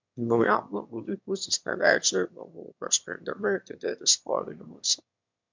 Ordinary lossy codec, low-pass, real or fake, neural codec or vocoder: MP3, 64 kbps; 7.2 kHz; fake; autoencoder, 22.05 kHz, a latent of 192 numbers a frame, VITS, trained on one speaker